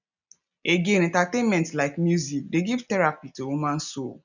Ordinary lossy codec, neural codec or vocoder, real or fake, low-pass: none; none; real; 7.2 kHz